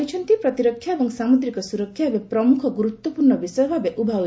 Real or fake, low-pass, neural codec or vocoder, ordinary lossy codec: real; none; none; none